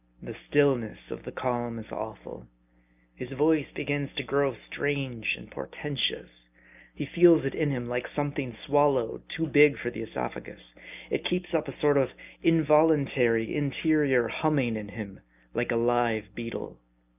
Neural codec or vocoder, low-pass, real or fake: none; 3.6 kHz; real